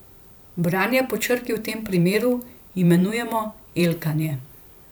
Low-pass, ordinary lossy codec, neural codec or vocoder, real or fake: none; none; vocoder, 44.1 kHz, 128 mel bands every 512 samples, BigVGAN v2; fake